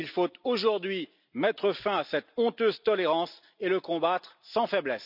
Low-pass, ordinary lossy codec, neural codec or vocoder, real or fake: 5.4 kHz; none; none; real